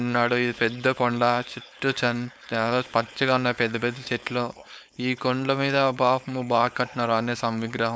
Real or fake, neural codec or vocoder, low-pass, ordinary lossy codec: fake; codec, 16 kHz, 4.8 kbps, FACodec; none; none